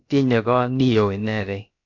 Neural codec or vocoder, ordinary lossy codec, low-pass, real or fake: codec, 16 kHz, about 1 kbps, DyCAST, with the encoder's durations; AAC, 48 kbps; 7.2 kHz; fake